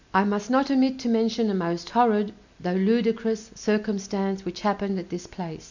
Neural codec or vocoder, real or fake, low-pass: none; real; 7.2 kHz